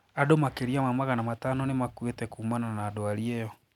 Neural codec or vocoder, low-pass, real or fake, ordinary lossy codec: none; 19.8 kHz; real; none